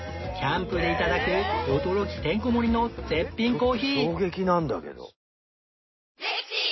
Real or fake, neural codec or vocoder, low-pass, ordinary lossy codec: real; none; 7.2 kHz; MP3, 24 kbps